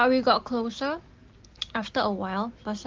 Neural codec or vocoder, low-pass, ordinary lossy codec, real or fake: none; 7.2 kHz; Opus, 16 kbps; real